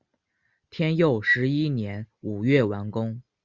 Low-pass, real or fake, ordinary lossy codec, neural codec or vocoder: 7.2 kHz; real; Opus, 64 kbps; none